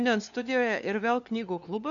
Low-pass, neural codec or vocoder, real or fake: 7.2 kHz; codec, 16 kHz, 2 kbps, FunCodec, trained on LibriTTS, 25 frames a second; fake